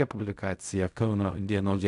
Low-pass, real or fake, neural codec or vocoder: 10.8 kHz; fake; codec, 16 kHz in and 24 kHz out, 0.4 kbps, LongCat-Audio-Codec, fine tuned four codebook decoder